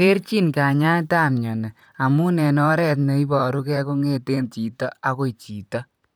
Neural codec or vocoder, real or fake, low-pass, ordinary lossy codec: vocoder, 44.1 kHz, 128 mel bands, Pupu-Vocoder; fake; none; none